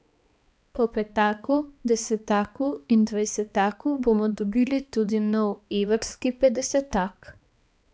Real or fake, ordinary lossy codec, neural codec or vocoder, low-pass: fake; none; codec, 16 kHz, 2 kbps, X-Codec, HuBERT features, trained on balanced general audio; none